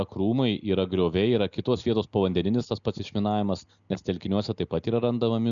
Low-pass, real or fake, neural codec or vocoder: 7.2 kHz; real; none